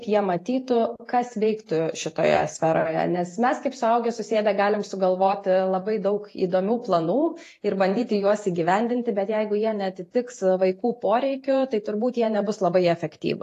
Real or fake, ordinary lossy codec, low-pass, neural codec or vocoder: fake; AAC, 48 kbps; 14.4 kHz; vocoder, 48 kHz, 128 mel bands, Vocos